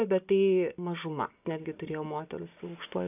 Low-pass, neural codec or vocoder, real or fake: 3.6 kHz; none; real